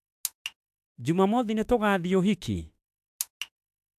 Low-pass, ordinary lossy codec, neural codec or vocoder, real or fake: 14.4 kHz; AAC, 96 kbps; autoencoder, 48 kHz, 32 numbers a frame, DAC-VAE, trained on Japanese speech; fake